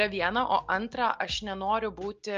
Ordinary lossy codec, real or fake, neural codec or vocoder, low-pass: Opus, 24 kbps; real; none; 7.2 kHz